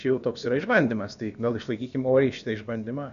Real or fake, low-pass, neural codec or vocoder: fake; 7.2 kHz; codec, 16 kHz, about 1 kbps, DyCAST, with the encoder's durations